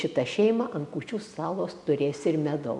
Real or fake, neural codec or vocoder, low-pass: real; none; 10.8 kHz